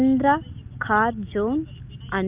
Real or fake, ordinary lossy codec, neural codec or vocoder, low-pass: real; Opus, 24 kbps; none; 3.6 kHz